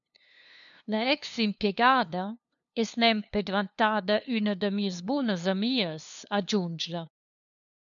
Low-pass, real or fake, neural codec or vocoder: 7.2 kHz; fake; codec, 16 kHz, 2 kbps, FunCodec, trained on LibriTTS, 25 frames a second